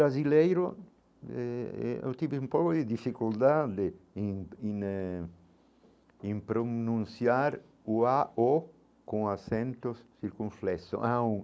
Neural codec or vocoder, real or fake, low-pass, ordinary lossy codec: none; real; none; none